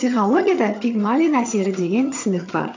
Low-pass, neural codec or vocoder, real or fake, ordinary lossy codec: 7.2 kHz; vocoder, 22.05 kHz, 80 mel bands, HiFi-GAN; fake; AAC, 48 kbps